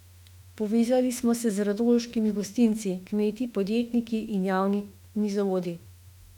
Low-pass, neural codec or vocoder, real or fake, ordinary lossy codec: 19.8 kHz; autoencoder, 48 kHz, 32 numbers a frame, DAC-VAE, trained on Japanese speech; fake; none